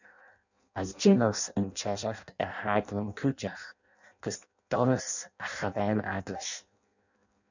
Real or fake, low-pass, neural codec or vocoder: fake; 7.2 kHz; codec, 16 kHz in and 24 kHz out, 0.6 kbps, FireRedTTS-2 codec